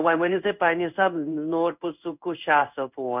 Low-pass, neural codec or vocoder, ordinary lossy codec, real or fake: 3.6 kHz; codec, 16 kHz in and 24 kHz out, 1 kbps, XY-Tokenizer; none; fake